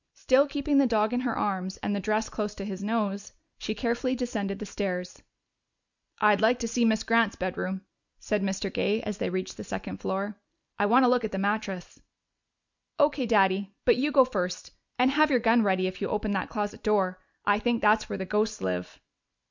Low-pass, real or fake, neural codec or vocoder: 7.2 kHz; real; none